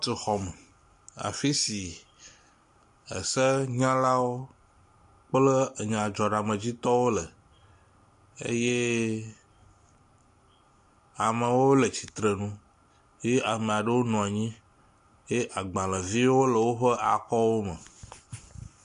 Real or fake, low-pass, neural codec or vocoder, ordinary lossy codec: real; 10.8 kHz; none; AAC, 64 kbps